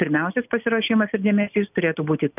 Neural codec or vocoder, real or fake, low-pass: none; real; 3.6 kHz